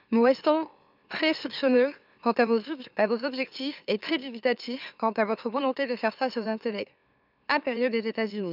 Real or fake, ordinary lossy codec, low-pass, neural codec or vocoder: fake; none; 5.4 kHz; autoencoder, 44.1 kHz, a latent of 192 numbers a frame, MeloTTS